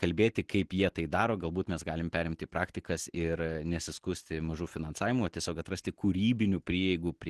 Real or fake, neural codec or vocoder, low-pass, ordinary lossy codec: real; none; 10.8 kHz; Opus, 16 kbps